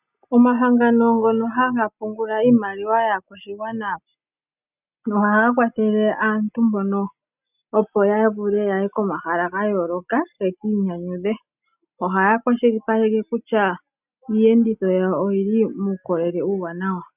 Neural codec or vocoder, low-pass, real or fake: none; 3.6 kHz; real